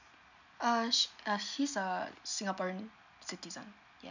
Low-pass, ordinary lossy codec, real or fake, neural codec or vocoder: 7.2 kHz; none; real; none